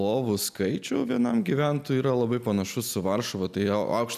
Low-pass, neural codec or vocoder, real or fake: 14.4 kHz; none; real